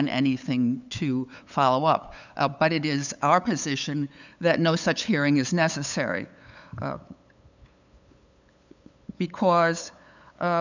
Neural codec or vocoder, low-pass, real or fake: codec, 16 kHz, 8 kbps, FunCodec, trained on LibriTTS, 25 frames a second; 7.2 kHz; fake